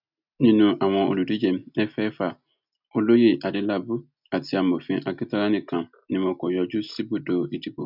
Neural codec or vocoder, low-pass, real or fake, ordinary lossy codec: none; 5.4 kHz; real; none